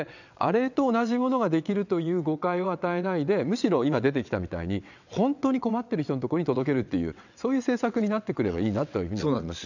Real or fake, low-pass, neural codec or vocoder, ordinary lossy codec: fake; 7.2 kHz; vocoder, 22.05 kHz, 80 mel bands, WaveNeXt; none